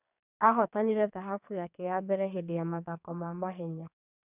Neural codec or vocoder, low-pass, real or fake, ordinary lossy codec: codec, 44.1 kHz, 2.6 kbps, SNAC; 3.6 kHz; fake; none